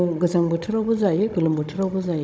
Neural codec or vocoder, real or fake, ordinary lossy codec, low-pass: codec, 16 kHz, 16 kbps, FunCodec, trained on Chinese and English, 50 frames a second; fake; none; none